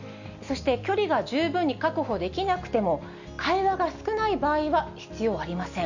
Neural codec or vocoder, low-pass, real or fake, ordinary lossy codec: none; 7.2 kHz; real; none